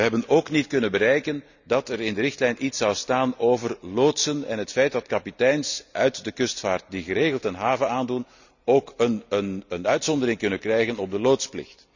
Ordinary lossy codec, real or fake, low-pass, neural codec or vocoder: none; real; 7.2 kHz; none